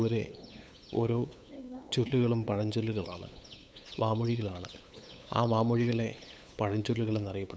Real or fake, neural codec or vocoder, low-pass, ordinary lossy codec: fake; codec, 16 kHz, 8 kbps, FunCodec, trained on LibriTTS, 25 frames a second; none; none